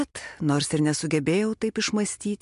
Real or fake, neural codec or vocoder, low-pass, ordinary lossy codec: real; none; 10.8 kHz; MP3, 64 kbps